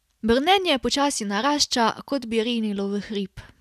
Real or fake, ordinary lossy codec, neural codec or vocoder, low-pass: real; none; none; 14.4 kHz